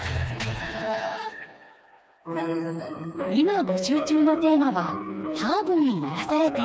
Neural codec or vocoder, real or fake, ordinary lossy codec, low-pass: codec, 16 kHz, 2 kbps, FreqCodec, smaller model; fake; none; none